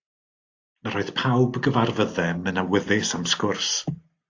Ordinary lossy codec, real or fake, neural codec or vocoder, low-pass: AAC, 48 kbps; real; none; 7.2 kHz